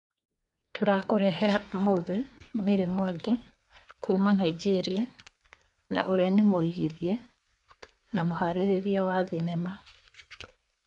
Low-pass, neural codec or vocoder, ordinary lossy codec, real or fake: 10.8 kHz; codec, 24 kHz, 1 kbps, SNAC; MP3, 96 kbps; fake